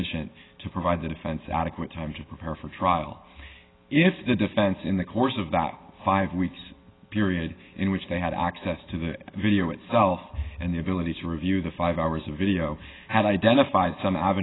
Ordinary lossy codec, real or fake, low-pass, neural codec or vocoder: AAC, 16 kbps; real; 7.2 kHz; none